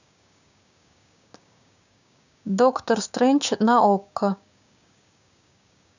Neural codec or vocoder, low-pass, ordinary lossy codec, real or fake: codec, 16 kHz, 6 kbps, DAC; 7.2 kHz; none; fake